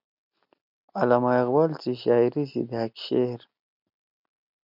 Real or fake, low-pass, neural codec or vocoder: real; 5.4 kHz; none